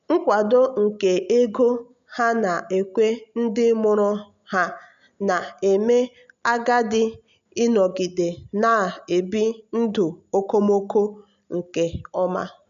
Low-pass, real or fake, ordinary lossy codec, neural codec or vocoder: 7.2 kHz; real; none; none